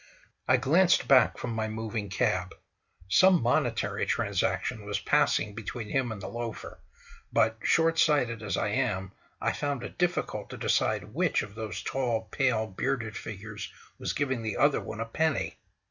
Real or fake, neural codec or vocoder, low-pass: real; none; 7.2 kHz